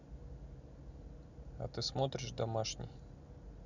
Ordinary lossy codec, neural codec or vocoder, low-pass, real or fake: none; none; 7.2 kHz; real